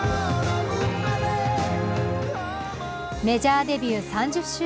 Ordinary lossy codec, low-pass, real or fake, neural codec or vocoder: none; none; real; none